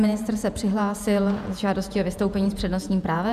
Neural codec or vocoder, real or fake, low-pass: vocoder, 48 kHz, 128 mel bands, Vocos; fake; 14.4 kHz